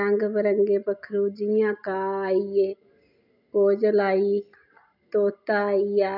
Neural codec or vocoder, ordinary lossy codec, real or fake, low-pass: none; none; real; 5.4 kHz